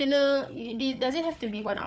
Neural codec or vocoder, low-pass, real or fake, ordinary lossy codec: codec, 16 kHz, 4 kbps, FunCodec, trained on Chinese and English, 50 frames a second; none; fake; none